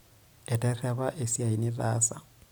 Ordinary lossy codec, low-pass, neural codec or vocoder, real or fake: none; none; none; real